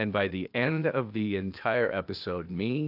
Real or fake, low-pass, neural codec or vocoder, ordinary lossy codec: fake; 5.4 kHz; codec, 16 kHz, 0.8 kbps, ZipCodec; AAC, 48 kbps